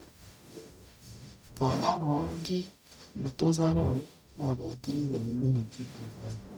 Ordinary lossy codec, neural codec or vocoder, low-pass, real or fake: none; codec, 44.1 kHz, 0.9 kbps, DAC; none; fake